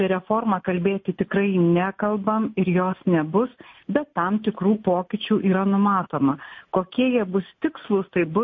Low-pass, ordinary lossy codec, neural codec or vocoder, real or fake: 7.2 kHz; MP3, 32 kbps; none; real